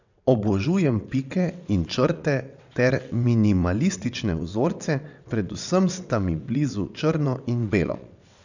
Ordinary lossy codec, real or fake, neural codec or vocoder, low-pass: none; fake; vocoder, 22.05 kHz, 80 mel bands, WaveNeXt; 7.2 kHz